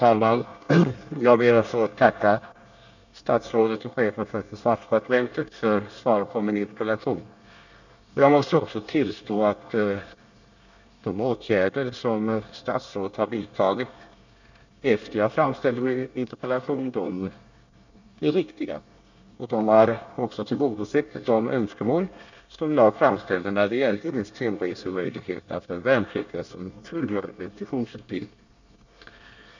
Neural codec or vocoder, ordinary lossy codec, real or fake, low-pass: codec, 24 kHz, 1 kbps, SNAC; none; fake; 7.2 kHz